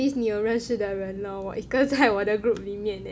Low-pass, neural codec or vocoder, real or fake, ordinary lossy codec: none; none; real; none